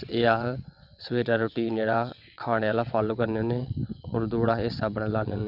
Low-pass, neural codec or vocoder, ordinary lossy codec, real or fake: 5.4 kHz; vocoder, 22.05 kHz, 80 mel bands, WaveNeXt; none; fake